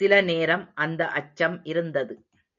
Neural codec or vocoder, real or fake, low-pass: none; real; 7.2 kHz